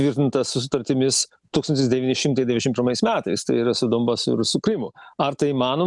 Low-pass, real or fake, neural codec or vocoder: 10.8 kHz; real; none